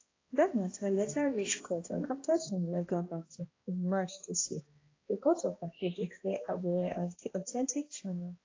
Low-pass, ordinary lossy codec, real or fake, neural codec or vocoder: 7.2 kHz; AAC, 32 kbps; fake; codec, 16 kHz, 1 kbps, X-Codec, HuBERT features, trained on balanced general audio